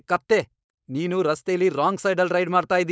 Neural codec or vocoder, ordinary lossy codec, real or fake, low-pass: codec, 16 kHz, 4.8 kbps, FACodec; none; fake; none